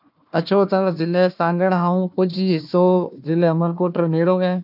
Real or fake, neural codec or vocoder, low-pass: fake; codec, 16 kHz, 1 kbps, FunCodec, trained on Chinese and English, 50 frames a second; 5.4 kHz